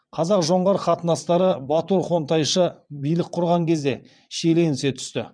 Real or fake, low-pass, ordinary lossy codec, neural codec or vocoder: fake; 9.9 kHz; none; vocoder, 22.05 kHz, 80 mel bands, WaveNeXt